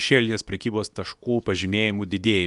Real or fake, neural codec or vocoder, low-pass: fake; codec, 24 kHz, 0.9 kbps, WavTokenizer, medium speech release version 2; 10.8 kHz